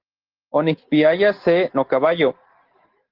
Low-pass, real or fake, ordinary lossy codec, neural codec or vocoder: 5.4 kHz; real; Opus, 16 kbps; none